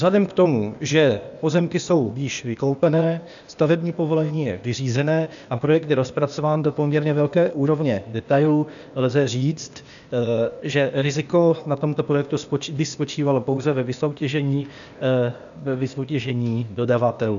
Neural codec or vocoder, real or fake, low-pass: codec, 16 kHz, 0.8 kbps, ZipCodec; fake; 7.2 kHz